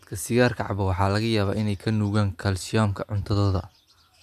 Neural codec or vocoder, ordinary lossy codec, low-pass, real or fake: none; none; 14.4 kHz; real